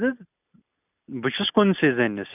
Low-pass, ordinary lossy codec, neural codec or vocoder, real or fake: 3.6 kHz; none; none; real